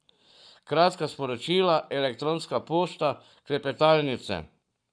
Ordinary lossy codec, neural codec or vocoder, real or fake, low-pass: none; codec, 44.1 kHz, 7.8 kbps, Pupu-Codec; fake; 9.9 kHz